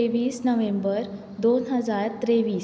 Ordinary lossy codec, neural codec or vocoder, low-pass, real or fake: none; none; none; real